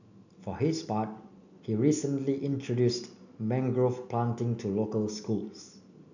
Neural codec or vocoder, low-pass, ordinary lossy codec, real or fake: none; 7.2 kHz; none; real